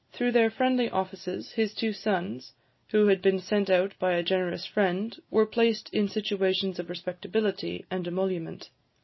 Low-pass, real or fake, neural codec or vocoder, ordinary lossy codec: 7.2 kHz; real; none; MP3, 24 kbps